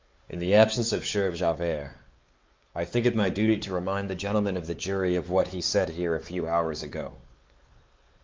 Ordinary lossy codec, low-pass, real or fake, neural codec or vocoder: Opus, 32 kbps; 7.2 kHz; fake; codec, 16 kHz, 4 kbps, X-Codec, WavLM features, trained on Multilingual LibriSpeech